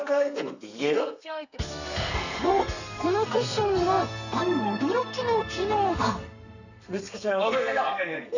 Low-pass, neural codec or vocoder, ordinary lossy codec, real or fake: 7.2 kHz; codec, 32 kHz, 1.9 kbps, SNAC; none; fake